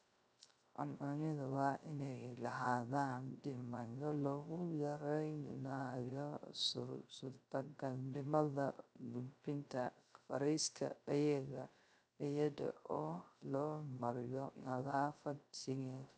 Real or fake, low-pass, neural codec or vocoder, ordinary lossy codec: fake; none; codec, 16 kHz, 0.3 kbps, FocalCodec; none